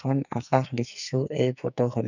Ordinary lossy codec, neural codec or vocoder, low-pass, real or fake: none; codec, 44.1 kHz, 2.6 kbps, SNAC; 7.2 kHz; fake